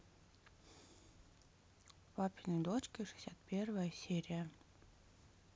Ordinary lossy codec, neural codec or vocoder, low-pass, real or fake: none; none; none; real